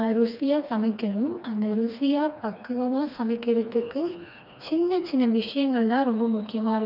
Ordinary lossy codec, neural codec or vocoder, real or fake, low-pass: none; codec, 16 kHz, 2 kbps, FreqCodec, smaller model; fake; 5.4 kHz